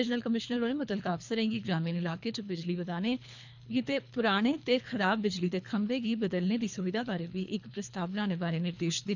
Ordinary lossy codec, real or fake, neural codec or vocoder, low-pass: none; fake; codec, 24 kHz, 3 kbps, HILCodec; 7.2 kHz